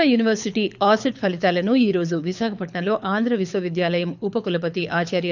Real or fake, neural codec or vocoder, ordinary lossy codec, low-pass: fake; codec, 24 kHz, 6 kbps, HILCodec; none; 7.2 kHz